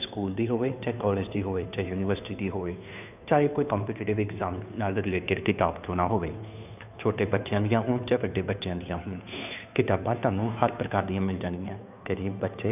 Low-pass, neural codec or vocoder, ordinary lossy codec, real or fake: 3.6 kHz; codec, 16 kHz, 2 kbps, FunCodec, trained on Chinese and English, 25 frames a second; none; fake